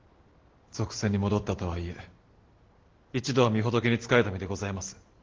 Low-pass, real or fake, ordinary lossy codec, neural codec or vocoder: 7.2 kHz; real; Opus, 16 kbps; none